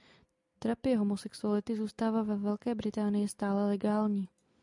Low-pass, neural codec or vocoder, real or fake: 10.8 kHz; none; real